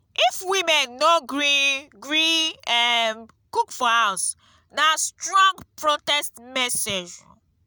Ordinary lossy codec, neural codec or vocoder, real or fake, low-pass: none; none; real; none